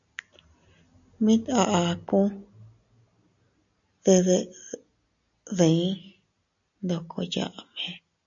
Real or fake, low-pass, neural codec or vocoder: real; 7.2 kHz; none